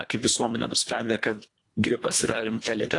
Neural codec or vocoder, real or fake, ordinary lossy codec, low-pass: codec, 24 kHz, 1.5 kbps, HILCodec; fake; AAC, 48 kbps; 10.8 kHz